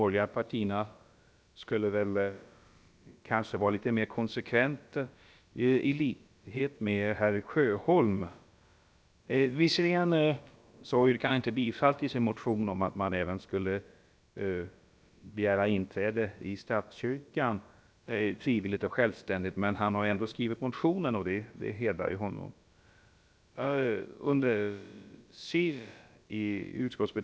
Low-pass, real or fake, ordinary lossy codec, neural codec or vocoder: none; fake; none; codec, 16 kHz, about 1 kbps, DyCAST, with the encoder's durations